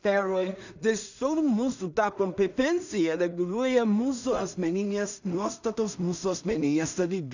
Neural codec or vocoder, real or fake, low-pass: codec, 16 kHz in and 24 kHz out, 0.4 kbps, LongCat-Audio-Codec, two codebook decoder; fake; 7.2 kHz